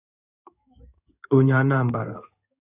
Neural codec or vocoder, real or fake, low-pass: codec, 16 kHz in and 24 kHz out, 1 kbps, XY-Tokenizer; fake; 3.6 kHz